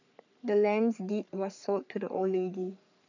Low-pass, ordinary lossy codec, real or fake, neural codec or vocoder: 7.2 kHz; none; fake; codec, 44.1 kHz, 3.4 kbps, Pupu-Codec